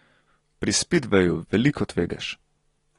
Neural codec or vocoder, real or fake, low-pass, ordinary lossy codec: none; real; 10.8 kHz; AAC, 32 kbps